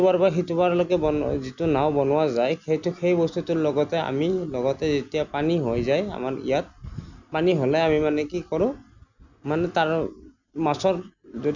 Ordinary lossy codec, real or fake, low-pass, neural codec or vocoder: none; real; 7.2 kHz; none